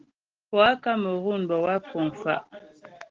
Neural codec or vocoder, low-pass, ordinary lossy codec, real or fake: none; 7.2 kHz; Opus, 16 kbps; real